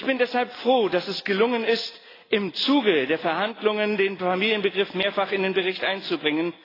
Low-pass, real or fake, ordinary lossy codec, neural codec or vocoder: 5.4 kHz; real; AAC, 24 kbps; none